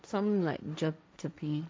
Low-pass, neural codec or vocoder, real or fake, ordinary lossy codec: none; codec, 16 kHz, 1.1 kbps, Voila-Tokenizer; fake; none